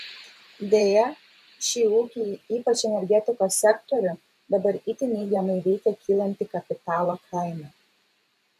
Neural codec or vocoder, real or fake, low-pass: vocoder, 44.1 kHz, 128 mel bands every 512 samples, BigVGAN v2; fake; 14.4 kHz